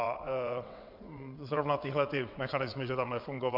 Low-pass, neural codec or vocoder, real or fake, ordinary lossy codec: 5.4 kHz; vocoder, 22.05 kHz, 80 mel bands, WaveNeXt; fake; MP3, 48 kbps